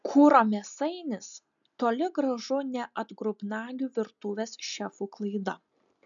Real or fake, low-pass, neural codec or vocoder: real; 7.2 kHz; none